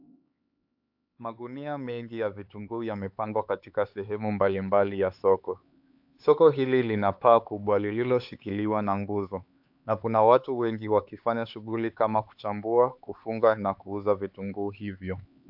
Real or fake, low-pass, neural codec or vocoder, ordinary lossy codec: fake; 5.4 kHz; codec, 16 kHz, 4 kbps, X-Codec, HuBERT features, trained on LibriSpeech; AAC, 48 kbps